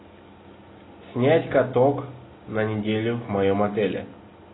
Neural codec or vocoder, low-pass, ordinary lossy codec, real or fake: none; 7.2 kHz; AAC, 16 kbps; real